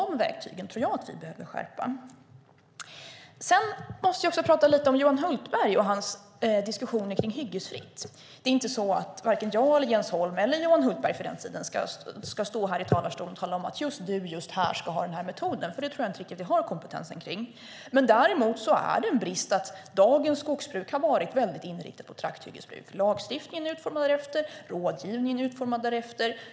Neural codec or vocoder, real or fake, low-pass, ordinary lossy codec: none; real; none; none